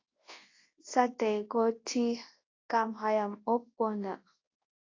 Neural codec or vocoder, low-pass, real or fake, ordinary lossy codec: codec, 24 kHz, 0.9 kbps, WavTokenizer, large speech release; 7.2 kHz; fake; AAC, 32 kbps